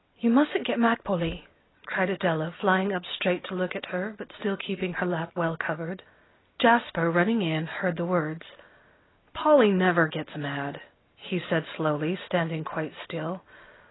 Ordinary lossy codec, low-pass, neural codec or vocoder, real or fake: AAC, 16 kbps; 7.2 kHz; none; real